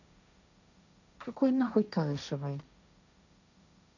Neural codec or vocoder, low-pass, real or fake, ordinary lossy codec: codec, 16 kHz, 1.1 kbps, Voila-Tokenizer; 7.2 kHz; fake; none